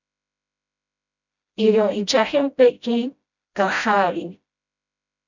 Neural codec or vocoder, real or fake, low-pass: codec, 16 kHz, 0.5 kbps, FreqCodec, smaller model; fake; 7.2 kHz